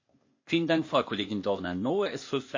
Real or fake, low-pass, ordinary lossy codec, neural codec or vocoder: fake; 7.2 kHz; MP3, 32 kbps; codec, 16 kHz, 0.8 kbps, ZipCodec